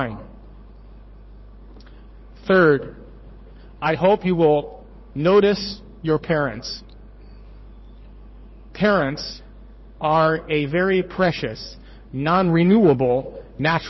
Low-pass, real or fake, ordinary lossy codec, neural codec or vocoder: 7.2 kHz; fake; MP3, 24 kbps; codec, 16 kHz in and 24 kHz out, 1 kbps, XY-Tokenizer